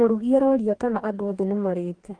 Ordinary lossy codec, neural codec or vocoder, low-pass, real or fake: Opus, 24 kbps; codec, 44.1 kHz, 2.6 kbps, DAC; 9.9 kHz; fake